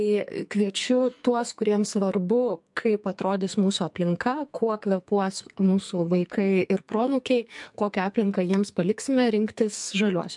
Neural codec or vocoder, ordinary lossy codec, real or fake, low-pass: codec, 44.1 kHz, 2.6 kbps, SNAC; MP3, 64 kbps; fake; 10.8 kHz